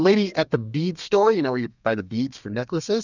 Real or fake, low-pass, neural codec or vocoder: fake; 7.2 kHz; codec, 32 kHz, 1.9 kbps, SNAC